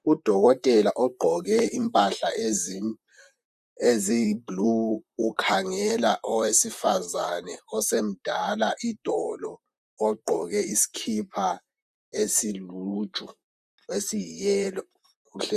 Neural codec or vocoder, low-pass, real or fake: vocoder, 44.1 kHz, 128 mel bands, Pupu-Vocoder; 14.4 kHz; fake